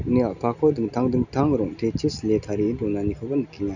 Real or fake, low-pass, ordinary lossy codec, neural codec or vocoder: real; 7.2 kHz; none; none